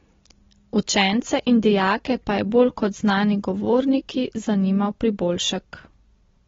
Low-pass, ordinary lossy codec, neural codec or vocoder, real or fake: 7.2 kHz; AAC, 24 kbps; none; real